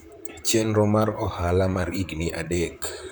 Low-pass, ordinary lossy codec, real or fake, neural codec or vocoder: none; none; fake; vocoder, 44.1 kHz, 128 mel bands, Pupu-Vocoder